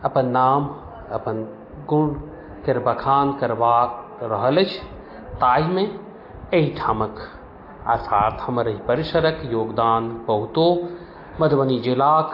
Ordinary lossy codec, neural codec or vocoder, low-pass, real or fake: AAC, 32 kbps; none; 5.4 kHz; real